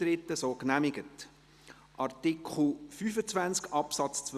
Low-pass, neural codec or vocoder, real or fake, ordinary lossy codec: 14.4 kHz; none; real; Opus, 64 kbps